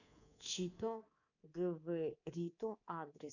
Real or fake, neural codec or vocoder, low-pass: fake; codec, 24 kHz, 3.1 kbps, DualCodec; 7.2 kHz